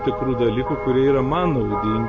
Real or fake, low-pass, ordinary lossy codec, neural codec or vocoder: real; 7.2 kHz; MP3, 32 kbps; none